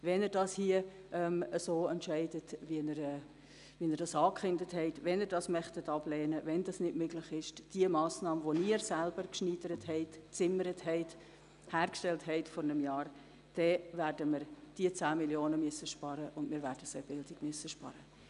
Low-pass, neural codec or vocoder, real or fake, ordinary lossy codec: 10.8 kHz; none; real; none